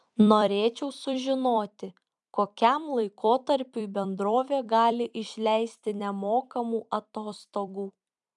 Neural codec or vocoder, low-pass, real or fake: vocoder, 44.1 kHz, 128 mel bands every 256 samples, BigVGAN v2; 10.8 kHz; fake